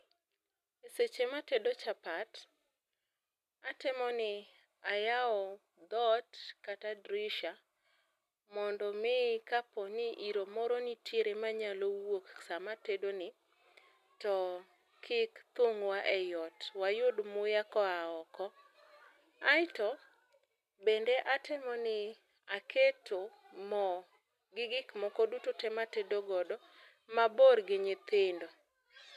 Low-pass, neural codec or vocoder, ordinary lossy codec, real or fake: 14.4 kHz; none; none; real